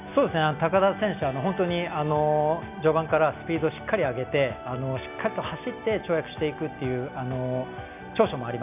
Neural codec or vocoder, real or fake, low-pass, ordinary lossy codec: none; real; 3.6 kHz; none